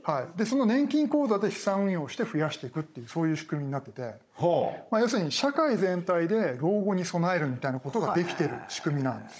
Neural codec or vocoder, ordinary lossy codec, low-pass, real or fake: codec, 16 kHz, 16 kbps, FunCodec, trained on Chinese and English, 50 frames a second; none; none; fake